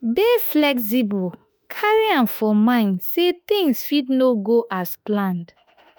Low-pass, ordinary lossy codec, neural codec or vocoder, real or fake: none; none; autoencoder, 48 kHz, 32 numbers a frame, DAC-VAE, trained on Japanese speech; fake